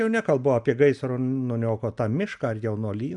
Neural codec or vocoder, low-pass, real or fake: none; 10.8 kHz; real